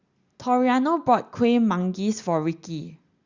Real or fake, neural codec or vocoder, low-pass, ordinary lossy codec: real; none; 7.2 kHz; Opus, 64 kbps